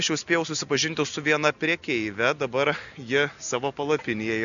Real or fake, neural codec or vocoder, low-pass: real; none; 7.2 kHz